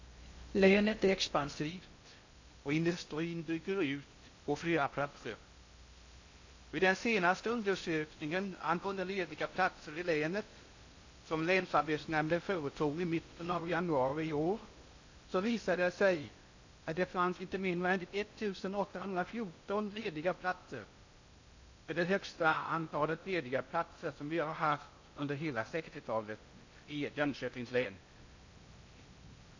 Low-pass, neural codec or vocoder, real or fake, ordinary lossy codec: 7.2 kHz; codec, 16 kHz in and 24 kHz out, 0.6 kbps, FocalCodec, streaming, 2048 codes; fake; AAC, 48 kbps